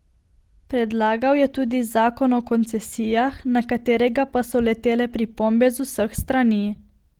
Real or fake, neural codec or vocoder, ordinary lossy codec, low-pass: real; none; Opus, 16 kbps; 19.8 kHz